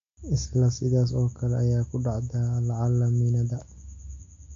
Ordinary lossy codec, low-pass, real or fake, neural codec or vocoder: AAC, 48 kbps; 7.2 kHz; real; none